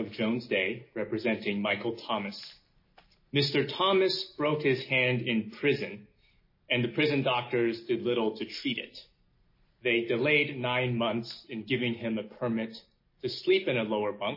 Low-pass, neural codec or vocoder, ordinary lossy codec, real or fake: 5.4 kHz; none; MP3, 24 kbps; real